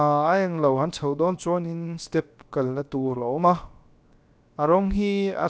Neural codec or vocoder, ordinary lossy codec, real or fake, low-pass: codec, 16 kHz, 0.7 kbps, FocalCodec; none; fake; none